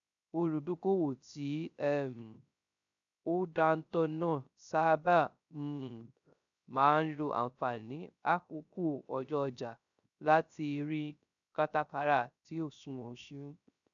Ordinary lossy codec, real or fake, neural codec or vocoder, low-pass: none; fake; codec, 16 kHz, 0.3 kbps, FocalCodec; 7.2 kHz